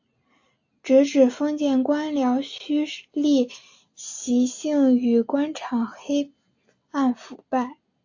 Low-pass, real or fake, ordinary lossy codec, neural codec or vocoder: 7.2 kHz; real; AAC, 48 kbps; none